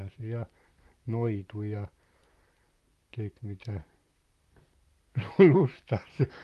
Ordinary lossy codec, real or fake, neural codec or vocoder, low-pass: Opus, 32 kbps; fake; vocoder, 44.1 kHz, 128 mel bands, Pupu-Vocoder; 14.4 kHz